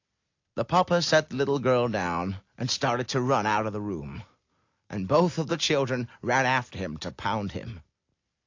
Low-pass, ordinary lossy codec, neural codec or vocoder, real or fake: 7.2 kHz; AAC, 48 kbps; none; real